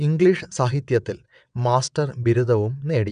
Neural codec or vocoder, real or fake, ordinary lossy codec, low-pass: vocoder, 22.05 kHz, 80 mel bands, WaveNeXt; fake; none; 9.9 kHz